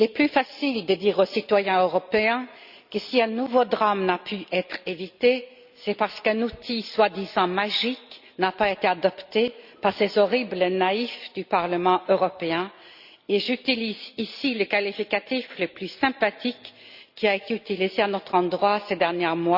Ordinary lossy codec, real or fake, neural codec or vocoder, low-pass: Opus, 64 kbps; real; none; 5.4 kHz